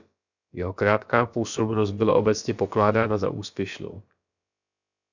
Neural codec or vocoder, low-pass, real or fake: codec, 16 kHz, about 1 kbps, DyCAST, with the encoder's durations; 7.2 kHz; fake